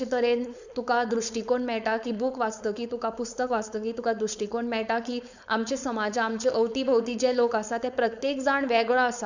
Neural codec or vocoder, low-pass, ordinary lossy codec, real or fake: codec, 16 kHz, 4.8 kbps, FACodec; 7.2 kHz; none; fake